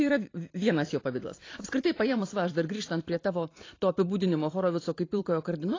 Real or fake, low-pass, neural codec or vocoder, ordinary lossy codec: fake; 7.2 kHz; vocoder, 44.1 kHz, 128 mel bands every 512 samples, BigVGAN v2; AAC, 32 kbps